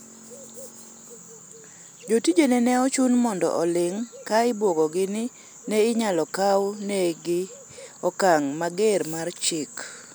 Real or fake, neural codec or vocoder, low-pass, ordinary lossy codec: real; none; none; none